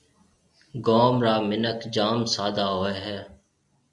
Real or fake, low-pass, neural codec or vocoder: real; 10.8 kHz; none